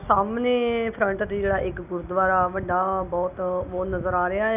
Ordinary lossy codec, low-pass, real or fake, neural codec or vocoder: none; 3.6 kHz; real; none